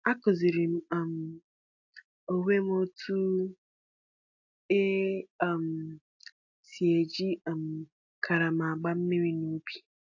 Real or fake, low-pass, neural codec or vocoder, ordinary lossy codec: real; 7.2 kHz; none; AAC, 48 kbps